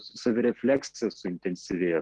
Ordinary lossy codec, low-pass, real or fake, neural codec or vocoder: Opus, 16 kbps; 10.8 kHz; real; none